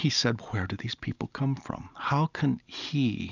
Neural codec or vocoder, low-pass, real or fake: none; 7.2 kHz; real